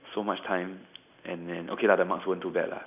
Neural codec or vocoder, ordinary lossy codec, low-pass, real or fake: none; none; 3.6 kHz; real